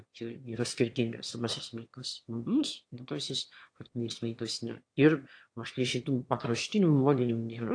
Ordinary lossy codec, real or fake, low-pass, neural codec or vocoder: AAC, 48 kbps; fake; 9.9 kHz; autoencoder, 22.05 kHz, a latent of 192 numbers a frame, VITS, trained on one speaker